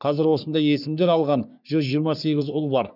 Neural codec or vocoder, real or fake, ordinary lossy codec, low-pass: codec, 44.1 kHz, 3.4 kbps, Pupu-Codec; fake; none; 5.4 kHz